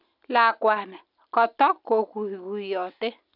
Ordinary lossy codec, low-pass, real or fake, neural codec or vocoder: none; 5.4 kHz; real; none